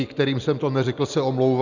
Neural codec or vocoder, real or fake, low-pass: none; real; 7.2 kHz